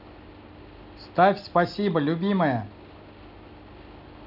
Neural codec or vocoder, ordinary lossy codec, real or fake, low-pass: none; none; real; 5.4 kHz